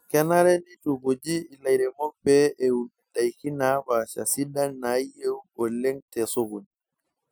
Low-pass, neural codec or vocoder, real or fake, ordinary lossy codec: none; none; real; none